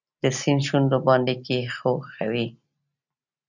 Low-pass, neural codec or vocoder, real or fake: 7.2 kHz; none; real